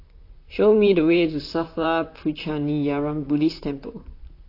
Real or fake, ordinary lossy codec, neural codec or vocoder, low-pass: fake; AAC, 32 kbps; vocoder, 44.1 kHz, 128 mel bands, Pupu-Vocoder; 5.4 kHz